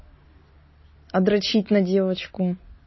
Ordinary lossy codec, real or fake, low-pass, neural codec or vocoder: MP3, 24 kbps; real; 7.2 kHz; none